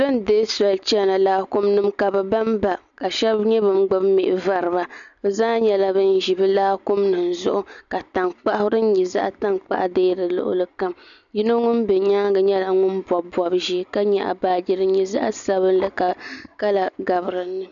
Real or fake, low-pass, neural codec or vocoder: real; 7.2 kHz; none